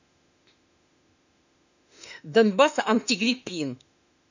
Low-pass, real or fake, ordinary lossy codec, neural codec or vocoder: 7.2 kHz; fake; none; autoencoder, 48 kHz, 32 numbers a frame, DAC-VAE, trained on Japanese speech